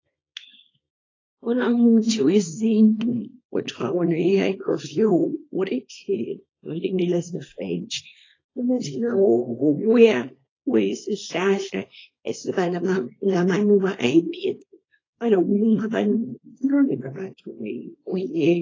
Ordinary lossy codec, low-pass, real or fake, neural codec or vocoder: AAC, 32 kbps; 7.2 kHz; fake; codec, 24 kHz, 0.9 kbps, WavTokenizer, small release